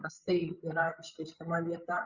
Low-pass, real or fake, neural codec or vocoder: 7.2 kHz; fake; codec, 16 kHz, 16 kbps, FreqCodec, larger model